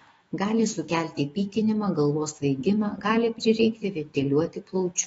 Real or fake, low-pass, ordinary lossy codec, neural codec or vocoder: fake; 19.8 kHz; AAC, 24 kbps; autoencoder, 48 kHz, 128 numbers a frame, DAC-VAE, trained on Japanese speech